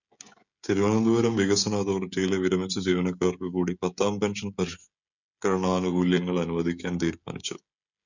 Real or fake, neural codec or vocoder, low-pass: fake; codec, 16 kHz, 16 kbps, FreqCodec, smaller model; 7.2 kHz